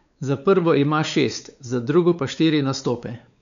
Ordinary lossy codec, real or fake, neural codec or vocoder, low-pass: none; fake; codec, 16 kHz, 4 kbps, X-Codec, WavLM features, trained on Multilingual LibriSpeech; 7.2 kHz